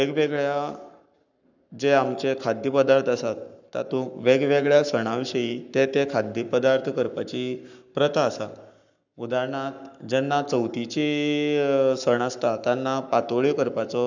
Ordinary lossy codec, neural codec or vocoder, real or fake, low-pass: none; codec, 44.1 kHz, 7.8 kbps, Pupu-Codec; fake; 7.2 kHz